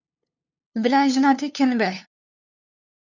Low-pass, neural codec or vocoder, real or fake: 7.2 kHz; codec, 16 kHz, 2 kbps, FunCodec, trained on LibriTTS, 25 frames a second; fake